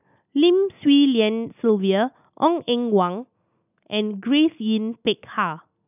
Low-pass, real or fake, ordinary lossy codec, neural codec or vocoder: 3.6 kHz; real; none; none